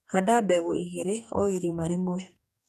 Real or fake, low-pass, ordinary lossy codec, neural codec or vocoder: fake; 14.4 kHz; none; codec, 44.1 kHz, 2.6 kbps, DAC